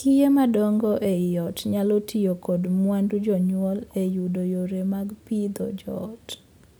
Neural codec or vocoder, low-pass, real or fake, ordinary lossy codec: none; none; real; none